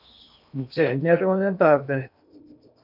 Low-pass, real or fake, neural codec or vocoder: 5.4 kHz; fake; codec, 16 kHz in and 24 kHz out, 0.8 kbps, FocalCodec, streaming, 65536 codes